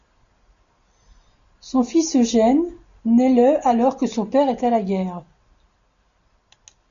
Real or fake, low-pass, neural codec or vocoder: real; 7.2 kHz; none